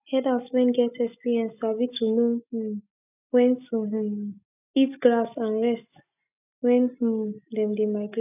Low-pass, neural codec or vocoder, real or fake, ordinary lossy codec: 3.6 kHz; none; real; none